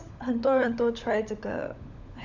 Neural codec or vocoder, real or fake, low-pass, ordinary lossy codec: codec, 16 kHz, 16 kbps, FunCodec, trained on LibriTTS, 50 frames a second; fake; 7.2 kHz; none